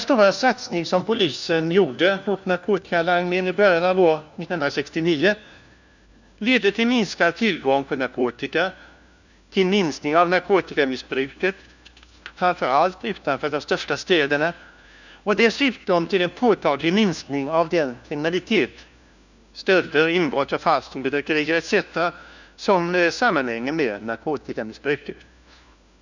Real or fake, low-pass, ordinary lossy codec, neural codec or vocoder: fake; 7.2 kHz; none; codec, 16 kHz, 1 kbps, FunCodec, trained on LibriTTS, 50 frames a second